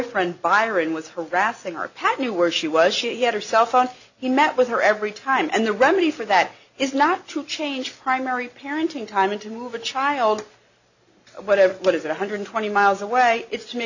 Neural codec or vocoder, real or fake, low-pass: none; real; 7.2 kHz